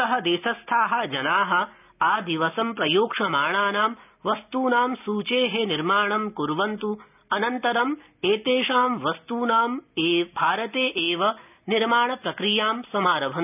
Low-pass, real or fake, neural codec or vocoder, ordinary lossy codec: 3.6 kHz; real; none; none